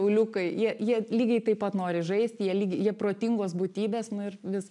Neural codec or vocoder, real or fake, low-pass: none; real; 10.8 kHz